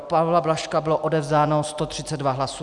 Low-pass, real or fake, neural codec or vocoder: 10.8 kHz; real; none